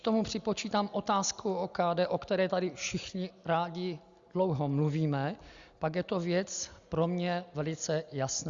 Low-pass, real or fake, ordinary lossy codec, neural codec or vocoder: 7.2 kHz; real; Opus, 64 kbps; none